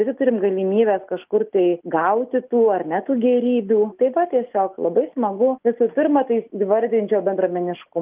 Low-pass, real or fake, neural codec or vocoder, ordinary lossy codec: 3.6 kHz; real; none; Opus, 32 kbps